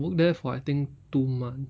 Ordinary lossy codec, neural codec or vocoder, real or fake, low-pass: none; none; real; none